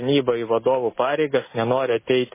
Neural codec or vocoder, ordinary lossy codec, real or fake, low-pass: none; MP3, 16 kbps; real; 3.6 kHz